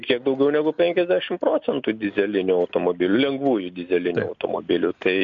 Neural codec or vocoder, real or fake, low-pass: none; real; 7.2 kHz